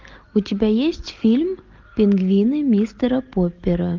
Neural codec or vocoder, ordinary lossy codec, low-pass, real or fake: none; Opus, 24 kbps; 7.2 kHz; real